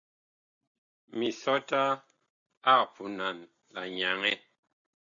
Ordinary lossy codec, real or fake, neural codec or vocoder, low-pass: MP3, 64 kbps; real; none; 7.2 kHz